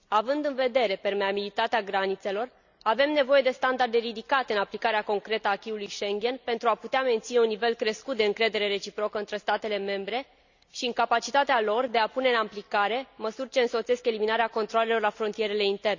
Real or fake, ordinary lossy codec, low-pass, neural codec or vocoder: real; none; 7.2 kHz; none